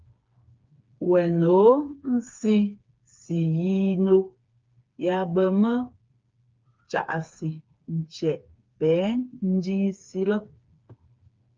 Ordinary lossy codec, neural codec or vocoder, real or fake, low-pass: Opus, 24 kbps; codec, 16 kHz, 4 kbps, FreqCodec, smaller model; fake; 7.2 kHz